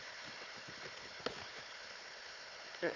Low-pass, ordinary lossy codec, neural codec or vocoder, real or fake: 7.2 kHz; Opus, 64 kbps; codec, 16 kHz, 4 kbps, FunCodec, trained on Chinese and English, 50 frames a second; fake